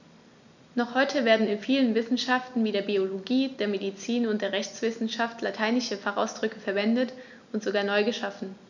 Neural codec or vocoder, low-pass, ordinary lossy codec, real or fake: none; 7.2 kHz; none; real